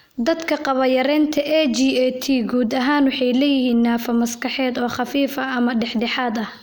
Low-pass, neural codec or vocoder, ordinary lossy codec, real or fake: none; none; none; real